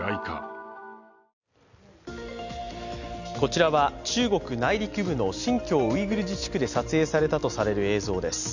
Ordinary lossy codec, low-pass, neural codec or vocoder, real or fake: none; 7.2 kHz; none; real